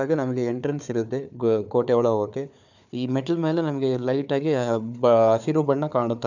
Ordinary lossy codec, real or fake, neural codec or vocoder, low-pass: none; fake; codec, 16 kHz, 4 kbps, FunCodec, trained on Chinese and English, 50 frames a second; 7.2 kHz